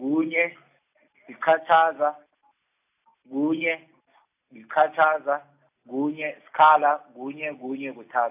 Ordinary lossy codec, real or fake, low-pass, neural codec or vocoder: AAC, 32 kbps; real; 3.6 kHz; none